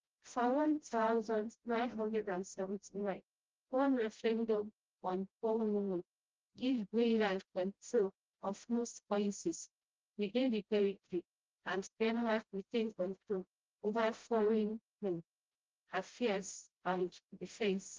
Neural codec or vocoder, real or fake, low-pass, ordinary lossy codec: codec, 16 kHz, 0.5 kbps, FreqCodec, smaller model; fake; 7.2 kHz; Opus, 16 kbps